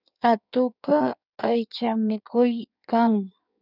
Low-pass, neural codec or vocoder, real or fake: 5.4 kHz; codec, 16 kHz in and 24 kHz out, 1.1 kbps, FireRedTTS-2 codec; fake